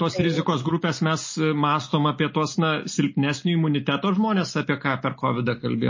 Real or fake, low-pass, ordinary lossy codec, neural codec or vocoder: real; 7.2 kHz; MP3, 32 kbps; none